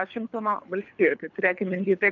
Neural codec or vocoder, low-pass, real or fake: codec, 24 kHz, 3 kbps, HILCodec; 7.2 kHz; fake